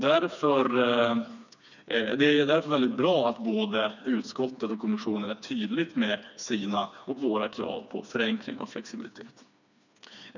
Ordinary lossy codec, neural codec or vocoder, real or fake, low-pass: none; codec, 16 kHz, 2 kbps, FreqCodec, smaller model; fake; 7.2 kHz